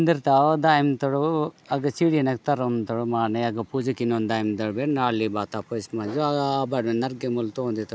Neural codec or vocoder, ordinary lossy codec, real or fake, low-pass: none; none; real; none